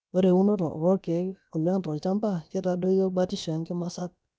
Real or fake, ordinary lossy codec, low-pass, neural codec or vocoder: fake; none; none; codec, 16 kHz, about 1 kbps, DyCAST, with the encoder's durations